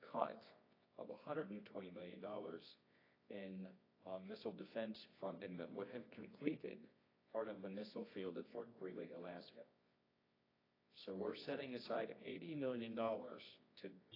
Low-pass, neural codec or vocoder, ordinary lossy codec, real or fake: 5.4 kHz; codec, 24 kHz, 0.9 kbps, WavTokenizer, medium music audio release; AAC, 24 kbps; fake